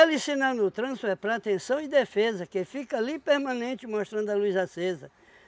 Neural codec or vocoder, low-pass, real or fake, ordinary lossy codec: none; none; real; none